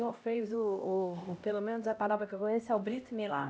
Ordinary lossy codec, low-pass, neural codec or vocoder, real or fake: none; none; codec, 16 kHz, 1 kbps, X-Codec, HuBERT features, trained on LibriSpeech; fake